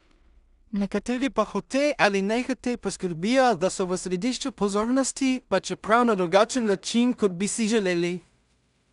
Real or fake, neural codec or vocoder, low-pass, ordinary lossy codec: fake; codec, 16 kHz in and 24 kHz out, 0.4 kbps, LongCat-Audio-Codec, two codebook decoder; 10.8 kHz; none